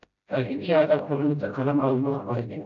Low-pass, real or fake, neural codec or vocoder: 7.2 kHz; fake; codec, 16 kHz, 0.5 kbps, FreqCodec, smaller model